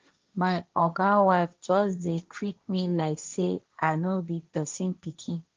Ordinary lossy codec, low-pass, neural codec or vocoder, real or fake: Opus, 24 kbps; 7.2 kHz; codec, 16 kHz, 1.1 kbps, Voila-Tokenizer; fake